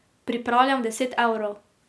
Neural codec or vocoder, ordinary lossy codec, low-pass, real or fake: none; none; none; real